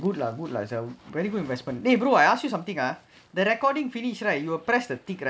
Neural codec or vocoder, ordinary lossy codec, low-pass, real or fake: none; none; none; real